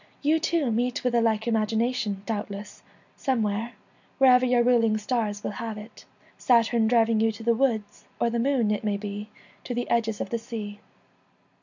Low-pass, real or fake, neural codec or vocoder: 7.2 kHz; real; none